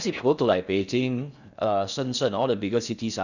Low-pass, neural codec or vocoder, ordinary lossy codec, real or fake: 7.2 kHz; codec, 16 kHz in and 24 kHz out, 0.6 kbps, FocalCodec, streaming, 4096 codes; none; fake